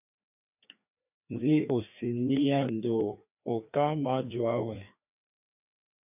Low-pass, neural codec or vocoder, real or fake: 3.6 kHz; codec, 16 kHz, 2 kbps, FreqCodec, larger model; fake